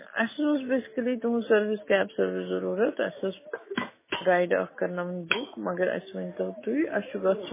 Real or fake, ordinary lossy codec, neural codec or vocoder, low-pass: fake; MP3, 16 kbps; autoencoder, 48 kHz, 128 numbers a frame, DAC-VAE, trained on Japanese speech; 3.6 kHz